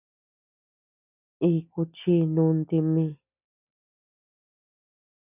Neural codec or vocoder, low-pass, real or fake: none; 3.6 kHz; real